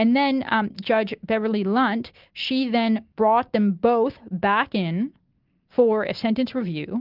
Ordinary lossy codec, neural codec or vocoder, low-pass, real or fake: Opus, 24 kbps; none; 5.4 kHz; real